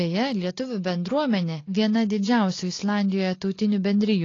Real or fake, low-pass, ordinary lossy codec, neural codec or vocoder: real; 7.2 kHz; AAC, 32 kbps; none